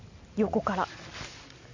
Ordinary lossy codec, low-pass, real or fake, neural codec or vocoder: AAC, 48 kbps; 7.2 kHz; real; none